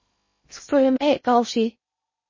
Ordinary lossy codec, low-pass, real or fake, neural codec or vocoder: MP3, 32 kbps; 7.2 kHz; fake; codec, 16 kHz in and 24 kHz out, 0.6 kbps, FocalCodec, streaming, 2048 codes